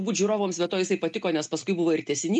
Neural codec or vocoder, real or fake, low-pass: none; real; 9.9 kHz